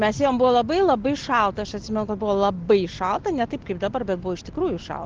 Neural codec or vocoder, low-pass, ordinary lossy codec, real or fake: none; 7.2 kHz; Opus, 16 kbps; real